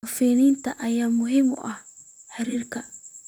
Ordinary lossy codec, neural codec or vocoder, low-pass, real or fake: none; vocoder, 44.1 kHz, 128 mel bands, Pupu-Vocoder; 19.8 kHz; fake